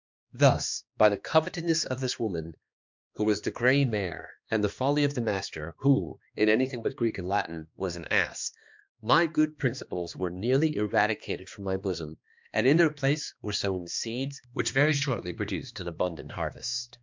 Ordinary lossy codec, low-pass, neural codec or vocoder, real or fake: MP3, 64 kbps; 7.2 kHz; codec, 16 kHz, 2 kbps, X-Codec, HuBERT features, trained on balanced general audio; fake